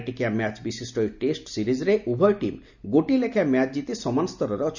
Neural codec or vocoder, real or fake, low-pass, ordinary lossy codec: none; real; 7.2 kHz; none